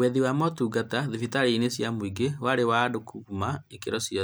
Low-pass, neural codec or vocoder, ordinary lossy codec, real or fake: none; none; none; real